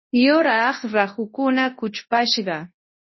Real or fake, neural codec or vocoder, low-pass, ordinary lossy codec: fake; codec, 24 kHz, 0.9 kbps, WavTokenizer, large speech release; 7.2 kHz; MP3, 24 kbps